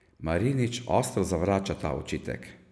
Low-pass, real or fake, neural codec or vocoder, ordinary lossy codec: none; real; none; none